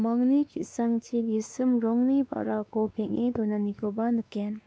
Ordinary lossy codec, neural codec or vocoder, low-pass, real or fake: none; codec, 16 kHz, 2 kbps, X-Codec, WavLM features, trained on Multilingual LibriSpeech; none; fake